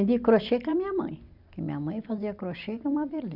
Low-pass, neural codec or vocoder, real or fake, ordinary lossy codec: 5.4 kHz; vocoder, 44.1 kHz, 128 mel bands every 256 samples, BigVGAN v2; fake; none